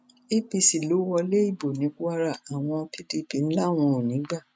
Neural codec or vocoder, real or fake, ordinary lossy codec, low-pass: none; real; none; none